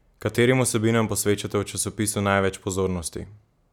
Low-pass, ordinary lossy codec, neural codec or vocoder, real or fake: 19.8 kHz; none; none; real